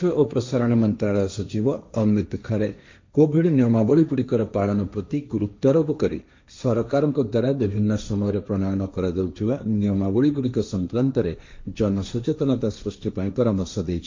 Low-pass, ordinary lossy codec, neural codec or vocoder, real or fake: 7.2 kHz; MP3, 64 kbps; codec, 16 kHz, 1.1 kbps, Voila-Tokenizer; fake